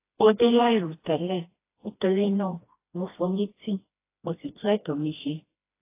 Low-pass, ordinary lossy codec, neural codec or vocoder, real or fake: 3.6 kHz; AAC, 24 kbps; codec, 16 kHz, 1 kbps, FreqCodec, smaller model; fake